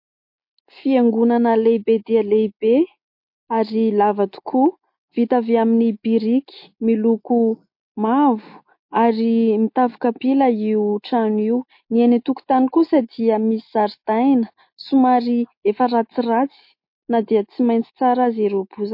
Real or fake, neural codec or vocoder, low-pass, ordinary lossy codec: real; none; 5.4 kHz; MP3, 32 kbps